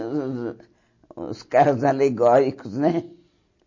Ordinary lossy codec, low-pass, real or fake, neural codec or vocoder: MP3, 32 kbps; 7.2 kHz; real; none